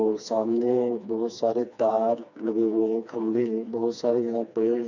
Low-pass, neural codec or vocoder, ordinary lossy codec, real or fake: 7.2 kHz; codec, 16 kHz, 2 kbps, FreqCodec, smaller model; none; fake